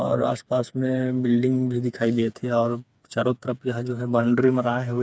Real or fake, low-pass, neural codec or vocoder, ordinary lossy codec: fake; none; codec, 16 kHz, 4 kbps, FreqCodec, smaller model; none